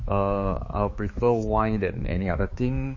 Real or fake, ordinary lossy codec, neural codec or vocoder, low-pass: fake; MP3, 32 kbps; codec, 16 kHz, 4 kbps, X-Codec, HuBERT features, trained on balanced general audio; 7.2 kHz